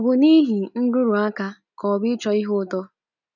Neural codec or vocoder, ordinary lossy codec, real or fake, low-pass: none; none; real; 7.2 kHz